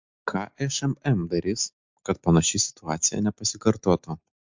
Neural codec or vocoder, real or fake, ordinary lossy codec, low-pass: none; real; MP3, 64 kbps; 7.2 kHz